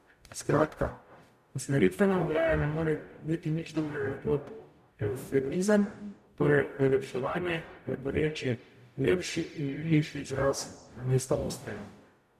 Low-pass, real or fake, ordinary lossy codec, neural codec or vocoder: 14.4 kHz; fake; none; codec, 44.1 kHz, 0.9 kbps, DAC